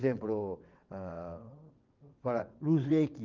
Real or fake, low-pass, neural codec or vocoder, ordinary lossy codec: fake; 7.2 kHz; vocoder, 22.05 kHz, 80 mel bands, WaveNeXt; Opus, 32 kbps